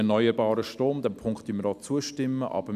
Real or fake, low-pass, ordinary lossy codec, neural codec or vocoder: real; 14.4 kHz; none; none